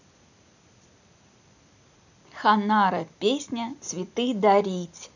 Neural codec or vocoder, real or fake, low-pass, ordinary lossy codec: none; real; 7.2 kHz; none